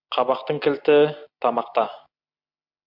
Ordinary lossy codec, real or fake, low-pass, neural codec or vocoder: MP3, 48 kbps; real; 5.4 kHz; none